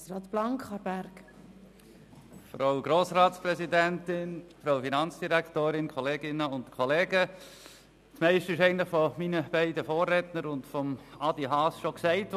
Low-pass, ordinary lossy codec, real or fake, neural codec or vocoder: 14.4 kHz; none; real; none